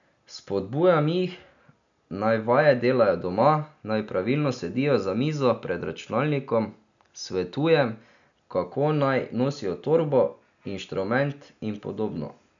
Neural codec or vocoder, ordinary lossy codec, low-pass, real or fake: none; none; 7.2 kHz; real